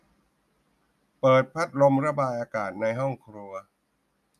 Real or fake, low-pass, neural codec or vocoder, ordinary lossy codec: real; 14.4 kHz; none; none